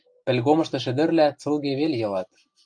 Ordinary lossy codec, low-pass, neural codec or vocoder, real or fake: AAC, 64 kbps; 9.9 kHz; none; real